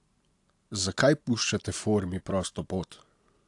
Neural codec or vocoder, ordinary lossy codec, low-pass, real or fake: none; AAC, 64 kbps; 10.8 kHz; real